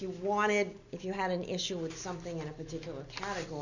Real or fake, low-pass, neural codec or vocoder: real; 7.2 kHz; none